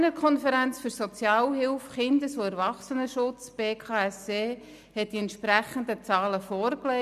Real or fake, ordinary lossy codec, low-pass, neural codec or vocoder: real; none; 14.4 kHz; none